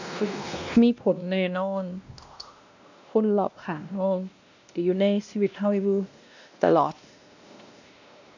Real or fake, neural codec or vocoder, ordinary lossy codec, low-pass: fake; codec, 16 kHz, 1 kbps, X-Codec, WavLM features, trained on Multilingual LibriSpeech; none; 7.2 kHz